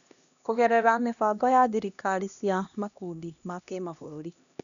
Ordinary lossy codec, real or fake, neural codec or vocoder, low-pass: none; fake; codec, 16 kHz, 1 kbps, X-Codec, HuBERT features, trained on LibriSpeech; 7.2 kHz